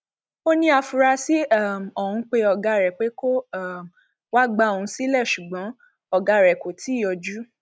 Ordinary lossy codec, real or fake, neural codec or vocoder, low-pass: none; real; none; none